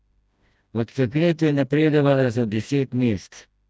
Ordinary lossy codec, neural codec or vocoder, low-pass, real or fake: none; codec, 16 kHz, 1 kbps, FreqCodec, smaller model; none; fake